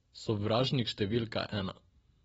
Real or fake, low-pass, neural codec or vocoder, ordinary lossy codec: real; 19.8 kHz; none; AAC, 24 kbps